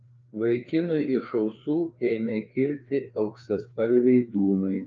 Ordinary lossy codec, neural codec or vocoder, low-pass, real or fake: Opus, 24 kbps; codec, 16 kHz, 2 kbps, FreqCodec, larger model; 7.2 kHz; fake